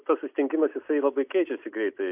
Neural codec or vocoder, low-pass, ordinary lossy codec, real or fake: none; 3.6 kHz; AAC, 32 kbps; real